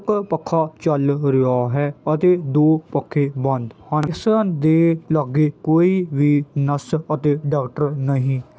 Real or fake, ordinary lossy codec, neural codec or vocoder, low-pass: real; none; none; none